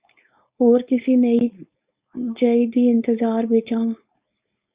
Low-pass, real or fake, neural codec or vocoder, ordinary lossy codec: 3.6 kHz; fake; codec, 16 kHz, 4.8 kbps, FACodec; Opus, 64 kbps